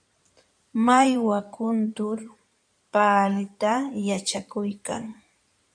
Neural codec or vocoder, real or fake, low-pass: codec, 16 kHz in and 24 kHz out, 2.2 kbps, FireRedTTS-2 codec; fake; 9.9 kHz